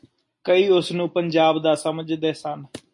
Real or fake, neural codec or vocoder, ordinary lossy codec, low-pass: real; none; MP3, 48 kbps; 10.8 kHz